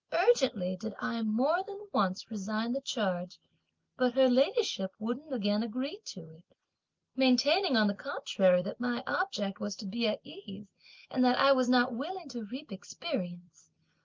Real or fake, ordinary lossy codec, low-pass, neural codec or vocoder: real; Opus, 24 kbps; 7.2 kHz; none